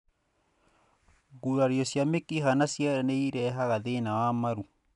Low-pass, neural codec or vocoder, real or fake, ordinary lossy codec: 10.8 kHz; none; real; none